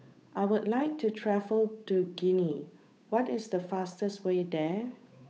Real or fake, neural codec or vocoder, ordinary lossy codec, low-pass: fake; codec, 16 kHz, 8 kbps, FunCodec, trained on Chinese and English, 25 frames a second; none; none